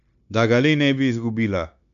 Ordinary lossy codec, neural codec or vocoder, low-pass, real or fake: none; codec, 16 kHz, 0.9 kbps, LongCat-Audio-Codec; 7.2 kHz; fake